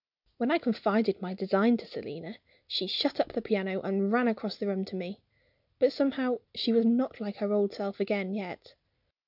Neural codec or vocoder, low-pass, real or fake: none; 5.4 kHz; real